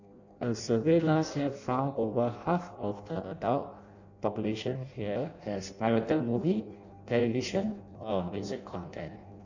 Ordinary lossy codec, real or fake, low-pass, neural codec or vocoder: none; fake; 7.2 kHz; codec, 16 kHz in and 24 kHz out, 0.6 kbps, FireRedTTS-2 codec